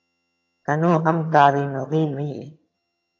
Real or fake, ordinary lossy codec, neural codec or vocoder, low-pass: fake; AAC, 48 kbps; vocoder, 22.05 kHz, 80 mel bands, HiFi-GAN; 7.2 kHz